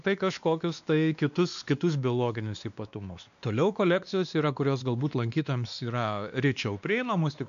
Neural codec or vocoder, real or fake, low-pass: codec, 16 kHz, 2 kbps, X-Codec, WavLM features, trained on Multilingual LibriSpeech; fake; 7.2 kHz